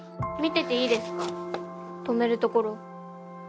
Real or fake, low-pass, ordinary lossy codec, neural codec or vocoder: real; none; none; none